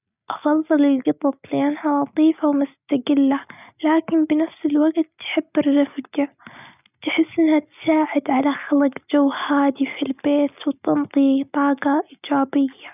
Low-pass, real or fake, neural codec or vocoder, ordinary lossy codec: 3.6 kHz; real; none; none